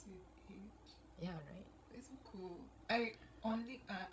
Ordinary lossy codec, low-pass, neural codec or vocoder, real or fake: none; none; codec, 16 kHz, 16 kbps, FreqCodec, larger model; fake